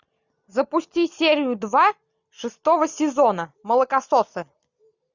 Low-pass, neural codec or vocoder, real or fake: 7.2 kHz; none; real